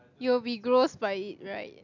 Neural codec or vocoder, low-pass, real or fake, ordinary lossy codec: none; 7.2 kHz; real; none